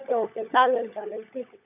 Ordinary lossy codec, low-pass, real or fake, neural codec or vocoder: none; 3.6 kHz; fake; codec, 16 kHz, 16 kbps, FunCodec, trained on LibriTTS, 50 frames a second